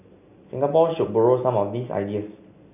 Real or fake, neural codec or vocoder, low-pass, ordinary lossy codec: real; none; 3.6 kHz; none